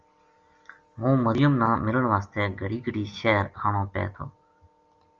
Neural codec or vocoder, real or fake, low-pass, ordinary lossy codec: none; real; 7.2 kHz; Opus, 32 kbps